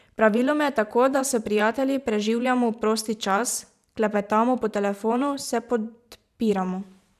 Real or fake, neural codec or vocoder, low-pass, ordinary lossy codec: fake; vocoder, 44.1 kHz, 128 mel bands every 512 samples, BigVGAN v2; 14.4 kHz; none